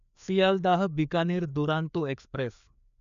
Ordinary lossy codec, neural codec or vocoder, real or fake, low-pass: none; codec, 16 kHz, 2 kbps, FreqCodec, larger model; fake; 7.2 kHz